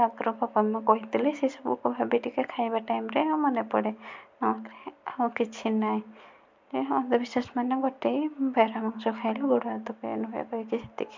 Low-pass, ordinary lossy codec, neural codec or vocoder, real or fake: 7.2 kHz; MP3, 64 kbps; none; real